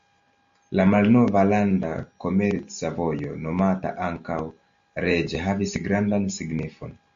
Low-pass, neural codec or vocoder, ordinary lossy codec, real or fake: 7.2 kHz; none; MP3, 48 kbps; real